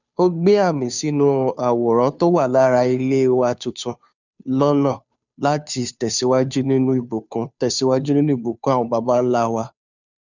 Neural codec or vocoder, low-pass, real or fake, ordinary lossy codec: codec, 16 kHz, 2 kbps, FunCodec, trained on Chinese and English, 25 frames a second; 7.2 kHz; fake; none